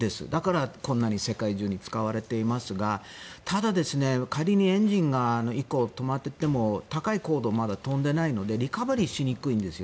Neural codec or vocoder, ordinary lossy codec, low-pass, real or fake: none; none; none; real